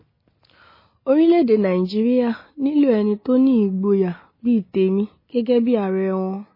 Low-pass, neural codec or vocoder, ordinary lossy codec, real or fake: 5.4 kHz; none; MP3, 24 kbps; real